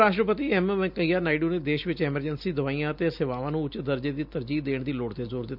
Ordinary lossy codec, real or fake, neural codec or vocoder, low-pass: none; real; none; 5.4 kHz